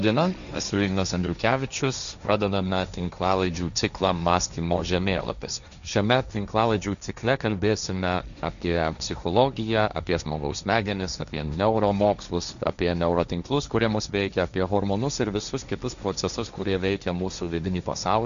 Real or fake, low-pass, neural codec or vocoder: fake; 7.2 kHz; codec, 16 kHz, 1.1 kbps, Voila-Tokenizer